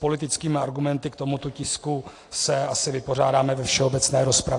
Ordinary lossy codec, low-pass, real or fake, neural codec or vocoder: AAC, 48 kbps; 10.8 kHz; real; none